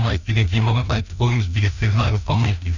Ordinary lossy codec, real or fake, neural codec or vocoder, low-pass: none; fake; codec, 16 kHz, 1 kbps, FreqCodec, larger model; 7.2 kHz